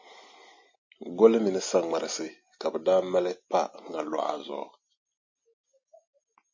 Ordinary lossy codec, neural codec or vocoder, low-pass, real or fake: MP3, 32 kbps; none; 7.2 kHz; real